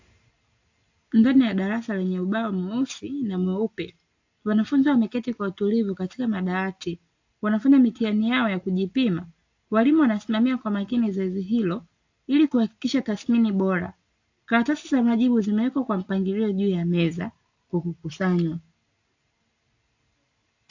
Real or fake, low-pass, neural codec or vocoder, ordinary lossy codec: real; 7.2 kHz; none; AAC, 48 kbps